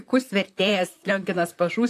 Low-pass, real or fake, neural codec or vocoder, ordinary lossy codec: 14.4 kHz; fake; vocoder, 44.1 kHz, 128 mel bands, Pupu-Vocoder; AAC, 64 kbps